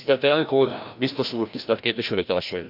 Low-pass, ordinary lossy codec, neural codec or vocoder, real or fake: 5.4 kHz; none; codec, 16 kHz, 1 kbps, FreqCodec, larger model; fake